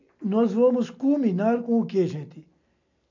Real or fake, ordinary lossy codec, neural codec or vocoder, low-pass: real; MP3, 48 kbps; none; 7.2 kHz